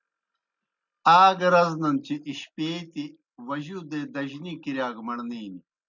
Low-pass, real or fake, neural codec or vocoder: 7.2 kHz; real; none